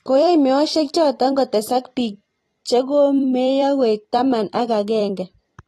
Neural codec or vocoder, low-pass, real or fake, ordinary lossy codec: vocoder, 44.1 kHz, 128 mel bands every 256 samples, BigVGAN v2; 19.8 kHz; fake; AAC, 32 kbps